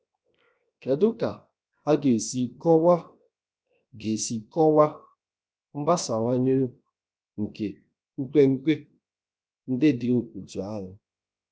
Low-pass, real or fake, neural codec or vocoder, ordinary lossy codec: none; fake; codec, 16 kHz, 0.7 kbps, FocalCodec; none